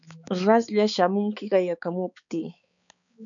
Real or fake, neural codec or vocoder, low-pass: fake; codec, 16 kHz, 4 kbps, X-Codec, HuBERT features, trained on balanced general audio; 7.2 kHz